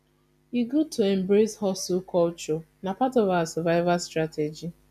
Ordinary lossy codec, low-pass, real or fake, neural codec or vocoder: none; 14.4 kHz; real; none